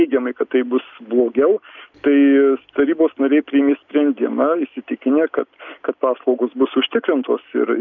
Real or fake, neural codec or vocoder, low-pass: real; none; 7.2 kHz